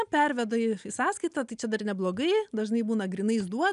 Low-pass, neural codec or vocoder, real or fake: 10.8 kHz; none; real